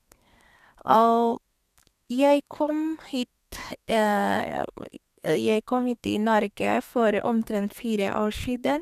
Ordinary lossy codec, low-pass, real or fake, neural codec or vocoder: none; 14.4 kHz; fake; codec, 32 kHz, 1.9 kbps, SNAC